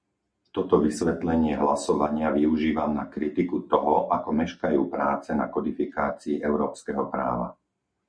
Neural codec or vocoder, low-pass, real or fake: none; 9.9 kHz; real